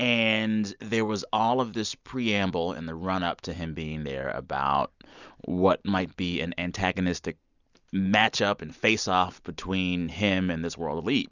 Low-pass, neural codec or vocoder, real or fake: 7.2 kHz; none; real